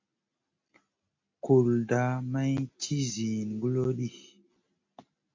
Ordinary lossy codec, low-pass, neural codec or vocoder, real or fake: MP3, 48 kbps; 7.2 kHz; none; real